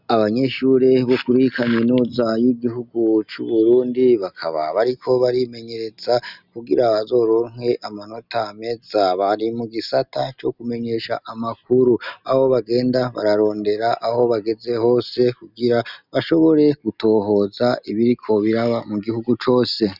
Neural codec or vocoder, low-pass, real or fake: none; 5.4 kHz; real